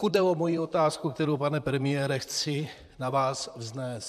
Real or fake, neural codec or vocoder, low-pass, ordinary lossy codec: fake; vocoder, 44.1 kHz, 128 mel bands, Pupu-Vocoder; 14.4 kHz; AAC, 96 kbps